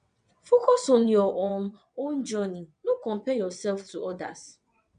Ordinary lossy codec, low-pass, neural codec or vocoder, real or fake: none; 9.9 kHz; vocoder, 22.05 kHz, 80 mel bands, WaveNeXt; fake